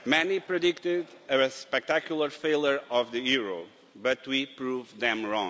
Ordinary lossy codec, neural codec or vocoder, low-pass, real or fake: none; none; none; real